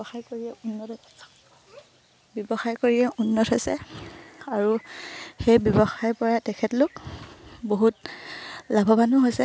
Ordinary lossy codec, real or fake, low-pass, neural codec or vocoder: none; real; none; none